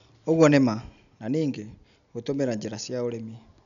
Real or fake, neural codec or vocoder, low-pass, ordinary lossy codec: real; none; 7.2 kHz; MP3, 96 kbps